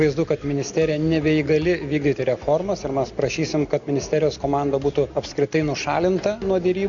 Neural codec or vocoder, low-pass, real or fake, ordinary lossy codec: none; 7.2 kHz; real; Opus, 64 kbps